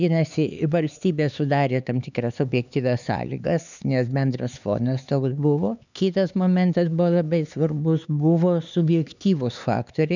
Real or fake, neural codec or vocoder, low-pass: fake; codec, 16 kHz, 4 kbps, X-Codec, HuBERT features, trained on LibriSpeech; 7.2 kHz